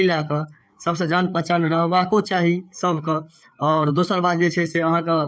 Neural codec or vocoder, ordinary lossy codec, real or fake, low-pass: codec, 16 kHz, 4 kbps, FreqCodec, larger model; none; fake; none